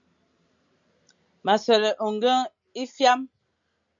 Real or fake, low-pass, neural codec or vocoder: real; 7.2 kHz; none